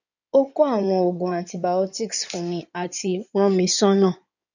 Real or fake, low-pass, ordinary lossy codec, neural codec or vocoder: fake; 7.2 kHz; none; codec, 16 kHz in and 24 kHz out, 2.2 kbps, FireRedTTS-2 codec